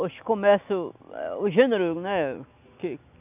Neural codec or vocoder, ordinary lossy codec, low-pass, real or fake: none; none; 3.6 kHz; real